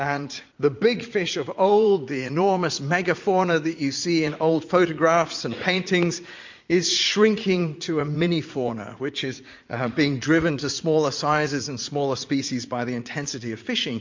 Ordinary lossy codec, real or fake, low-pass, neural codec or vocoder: MP3, 48 kbps; fake; 7.2 kHz; vocoder, 44.1 kHz, 80 mel bands, Vocos